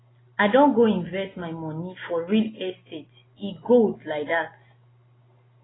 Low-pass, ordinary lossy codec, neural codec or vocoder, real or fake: 7.2 kHz; AAC, 16 kbps; none; real